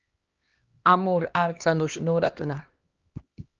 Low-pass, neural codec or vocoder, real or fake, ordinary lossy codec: 7.2 kHz; codec, 16 kHz, 1 kbps, X-Codec, HuBERT features, trained on LibriSpeech; fake; Opus, 24 kbps